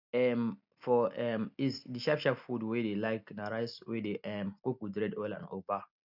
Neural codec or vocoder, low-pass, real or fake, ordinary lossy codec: none; 5.4 kHz; real; none